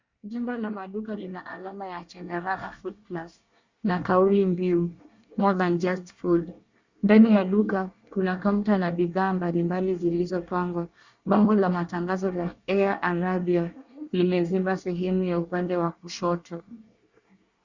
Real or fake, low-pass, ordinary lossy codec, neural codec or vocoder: fake; 7.2 kHz; Opus, 64 kbps; codec, 24 kHz, 1 kbps, SNAC